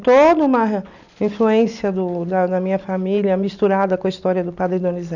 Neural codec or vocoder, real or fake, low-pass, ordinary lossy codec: none; real; 7.2 kHz; none